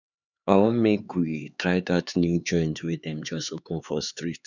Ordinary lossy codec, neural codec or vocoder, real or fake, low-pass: none; codec, 16 kHz, 4 kbps, X-Codec, HuBERT features, trained on LibriSpeech; fake; 7.2 kHz